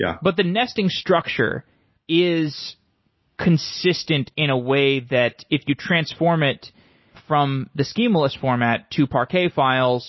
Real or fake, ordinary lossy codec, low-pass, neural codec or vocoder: real; MP3, 24 kbps; 7.2 kHz; none